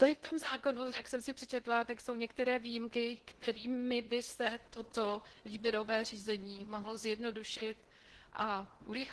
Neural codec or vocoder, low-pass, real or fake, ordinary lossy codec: codec, 16 kHz in and 24 kHz out, 0.8 kbps, FocalCodec, streaming, 65536 codes; 10.8 kHz; fake; Opus, 16 kbps